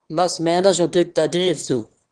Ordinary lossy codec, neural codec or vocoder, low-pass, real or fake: Opus, 16 kbps; autoencoder, 22.05 kHz, a latent of 192 numbers a frame, VITS, trained on one speaker; 9.9 kHz; fake